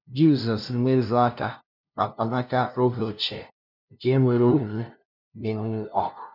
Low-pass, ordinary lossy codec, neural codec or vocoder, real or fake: 5.4 kHz; none; codec, 16 kHz, 0.5 kbps, FunCodec, trained on LibriTTS, 25 frames a second; fake